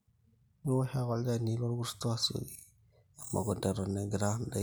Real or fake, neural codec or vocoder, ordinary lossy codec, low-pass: real; none; none; none